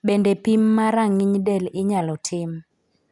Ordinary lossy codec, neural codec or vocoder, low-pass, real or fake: none; none; 10.8 kHz; real